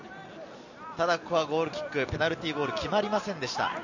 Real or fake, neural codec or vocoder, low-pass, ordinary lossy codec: real; none; 7.2 kHz; none